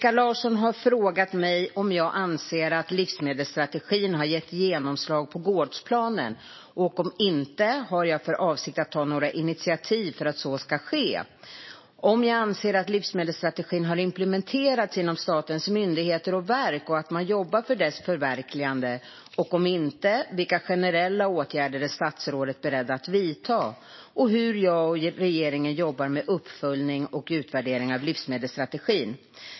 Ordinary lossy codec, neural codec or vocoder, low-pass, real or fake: MP3, 24 kbps; none; 7.2 kHz; real